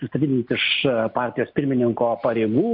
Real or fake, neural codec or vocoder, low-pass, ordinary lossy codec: real; none; 5.4 kHz; MP3, 48 kbps